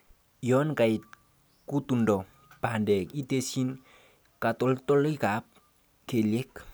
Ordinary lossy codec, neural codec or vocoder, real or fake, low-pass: none; none; real; none